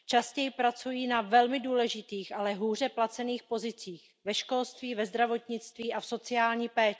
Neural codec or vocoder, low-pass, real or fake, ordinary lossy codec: none; none; real; none